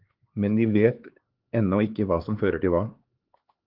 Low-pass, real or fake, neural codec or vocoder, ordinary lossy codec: 5.4 kHz; fake; codec, 16 kHz, 4 kbps, X-Codec, HuBERT features, trained on LibriSpeech; Opus, 16 kbps